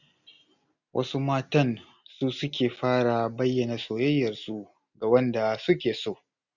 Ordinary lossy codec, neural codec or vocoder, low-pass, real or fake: none; none; 7.2 kHz; real